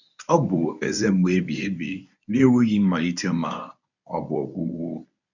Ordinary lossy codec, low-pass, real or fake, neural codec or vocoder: none; 7.2 kHz; fake; codec, 24 kHz, 0.9 kbps, WavTokenizer, medium speech release version 1